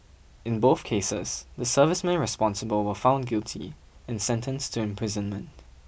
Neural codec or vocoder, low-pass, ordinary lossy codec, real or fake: none; none; none; real